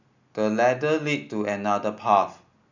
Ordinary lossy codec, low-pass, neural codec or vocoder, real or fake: none; 7.2 kHz; none; real